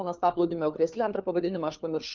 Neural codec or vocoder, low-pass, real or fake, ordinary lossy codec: codec, 16 kHz, 4 kbps, FunCodec, trained on LibriTTS, 50 frames a second; 7.2 kHz; fake; Opus, 24 kbps